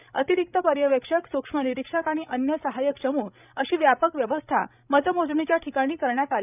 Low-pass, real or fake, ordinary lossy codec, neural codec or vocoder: 3.6 kHz; fake; none; codec, 16 kHz, 16 kbps, FreqCodec, larger model